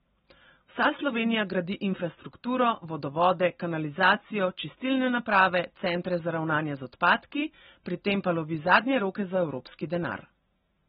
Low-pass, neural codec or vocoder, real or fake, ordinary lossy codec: 19.8 kHz; none; real; AAC, 16 kbps